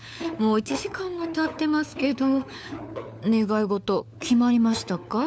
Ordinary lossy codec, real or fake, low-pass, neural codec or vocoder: none; fake; none; codec, 16 kHz, 4 kbps, FunCodec, trained on LibriTTS, 50 frames a second